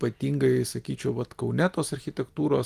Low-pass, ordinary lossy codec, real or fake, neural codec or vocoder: 14.4 kHz; Opus, 24 kbps; real; none